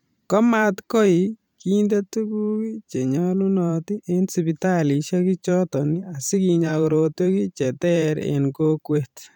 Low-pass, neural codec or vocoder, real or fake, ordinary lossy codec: 19.8 kHz; vocoder, 44.1 kHz, 128 mel bands every 512 samples, BigVGAN v2; fake; none